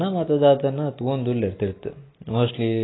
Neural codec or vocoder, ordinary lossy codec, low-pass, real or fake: none; AAC, 16 kbps; 7.2 kHz; real